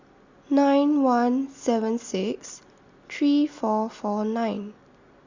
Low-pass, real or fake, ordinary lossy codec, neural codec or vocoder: 7.2 kHz; real; Opus, 64 kbps; none